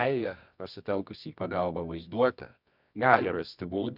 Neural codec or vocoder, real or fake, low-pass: codec, 24 kHz, 0.9 kbps, WavTokenizer, medium music audio release; fake; 5.4 kHz